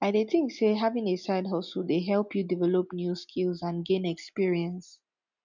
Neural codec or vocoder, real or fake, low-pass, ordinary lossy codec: none; real; 7.2 kHz; none